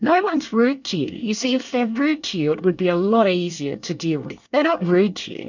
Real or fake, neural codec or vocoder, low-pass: fake; codec, 24 kHz, 1 kbps, SNAC; 7.2 kHz